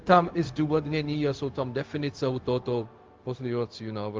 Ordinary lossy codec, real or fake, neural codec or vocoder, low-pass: Opus, 32 kbps; fake; codec, 16 kHz, 0.4 kbps, LongCat-Audio-Codec; 7.2 kHz